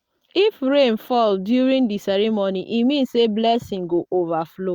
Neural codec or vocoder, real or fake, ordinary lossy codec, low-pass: none; real; Opus, 64 kbps; 19.8 kHz